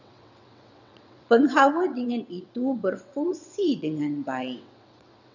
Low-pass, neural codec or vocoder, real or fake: 7.2 kHz; vocoder, 22.05 kHz, 80 mel bands, WaveNeXt; fake